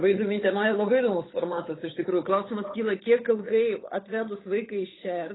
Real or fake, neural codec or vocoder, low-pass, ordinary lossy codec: fake; codec, 16 kHz, 8 kbps, FunCodec, trained on Chinese and English, 25 frames a second; 7.2 kHz; AAC, 16 kbps